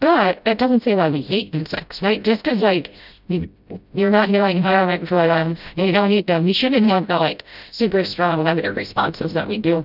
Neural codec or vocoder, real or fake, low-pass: codec, 16 kHz, 0.5 kbps, FreqCodec, smaller model; fake; 5.4 kHz